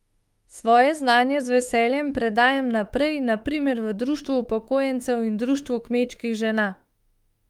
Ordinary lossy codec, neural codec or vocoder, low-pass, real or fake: Opus, 32 kbps; autoencoder, 48 kHz, 32 numbers a frame, DAC-VAE, trained on Japanese speech; 19.8 kHz; fake